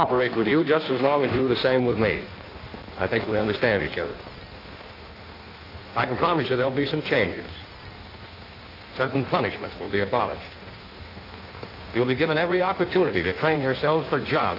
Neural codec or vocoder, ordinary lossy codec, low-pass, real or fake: codec, 16 kHz in and 24 kHz out, 1.1 kbps, FireRedTTS-2 codec; AAC, 32 kbps; 5.4 kHz; fake